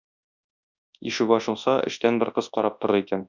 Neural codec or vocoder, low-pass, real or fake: codec, 24 kHz, 0.9 kbps, WavTokenizer, large speech release; 7.2 kHz; fake